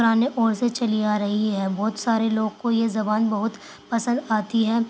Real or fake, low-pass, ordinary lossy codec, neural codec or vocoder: real; none; none; none